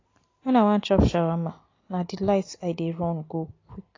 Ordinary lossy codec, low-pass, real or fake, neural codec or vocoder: AAC, 32 kbps; 7.2 kHz; real; none